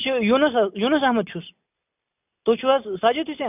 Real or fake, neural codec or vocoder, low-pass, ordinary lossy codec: real; none; 3.6 kHz; none